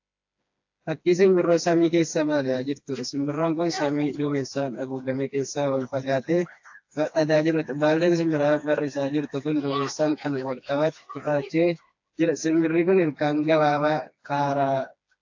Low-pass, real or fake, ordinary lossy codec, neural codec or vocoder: 7.2 kHz; fake; AAC, 48 kbps; codec, 16 kHz, 2 kbps, FreqCodec, smaller model